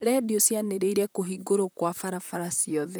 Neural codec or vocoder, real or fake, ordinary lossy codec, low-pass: vocoder, 44.1 kHz, 128 mel bands, Pupu-Vocoder; fake; none; none